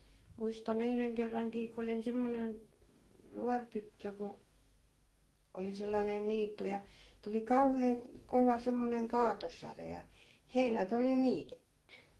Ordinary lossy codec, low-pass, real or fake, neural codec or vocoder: Opus, 24 kbps; 19.8 kHz; fake; codec, 44.1 kHz, 2.6 kbps, DAC